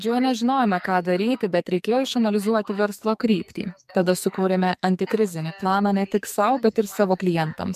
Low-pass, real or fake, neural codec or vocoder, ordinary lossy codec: 14.4 kHz; fake; codec, 44.1 kHz, 2.6 kbps, SNAC; MP3, 96 kbps